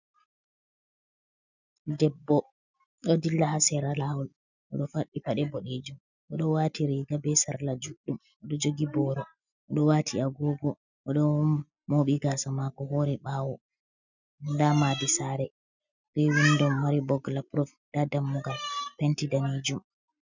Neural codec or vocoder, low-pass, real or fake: none; 7.2 kHz; real